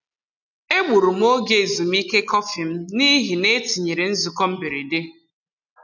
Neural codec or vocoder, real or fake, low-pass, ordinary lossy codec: none; real; 7.2 kHz; none